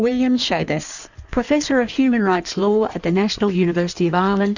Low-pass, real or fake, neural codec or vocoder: 7.2 kHz; fake; codec, 16 kHz in and 24 kHz out, 1.1 kbps, FireRedTTS-2 codec